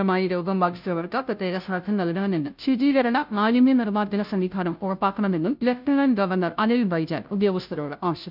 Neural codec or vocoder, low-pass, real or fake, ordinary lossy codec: codec, 16 kHz, 0.5 kbps, FunCodec, trained on Chinese and English, 25 frames a second; 5.4 kHz; fake; none